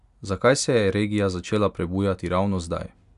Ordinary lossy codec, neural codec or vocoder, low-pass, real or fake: none; none; 10.8 kHz; real